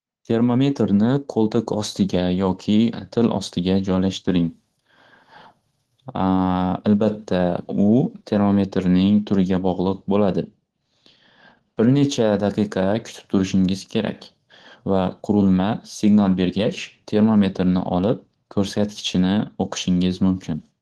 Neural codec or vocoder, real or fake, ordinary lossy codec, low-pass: codec, 24 kHz, 3.1 kbps, DualCodec; fake; Opus, 16 kbps; 10.8 kHz